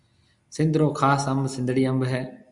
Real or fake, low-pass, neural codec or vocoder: real; 10.8 kHz; none